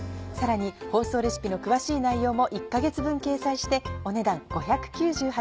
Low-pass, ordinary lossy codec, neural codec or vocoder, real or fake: none; none; none; real